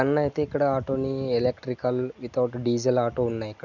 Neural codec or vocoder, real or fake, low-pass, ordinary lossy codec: none; real; 7.2 kHz; none